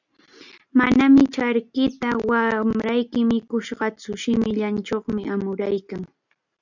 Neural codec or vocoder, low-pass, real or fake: none; 7.2 kHz; real